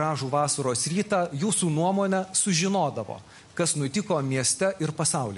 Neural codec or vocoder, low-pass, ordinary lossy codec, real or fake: none; 14.4 kHz; MP3, 48 kbps; real